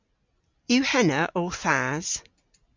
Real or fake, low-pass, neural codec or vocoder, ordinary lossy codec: real; 7.2 kHz; none; MP3, 64 kbps